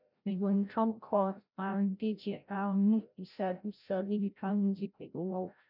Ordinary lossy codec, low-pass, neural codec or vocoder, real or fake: none; 5.4 kHz; codec, 16 kHz, 0.5 kbps, FreqCodec, larger model; fake